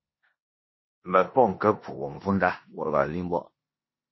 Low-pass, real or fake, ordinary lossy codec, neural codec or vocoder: 7.2 kHz; fake; MP3, 24 kbps; codec, 16 kHz in and 24 kHz out, 0.9 kbps, LongCat-Audio-Codec, four codebook decoder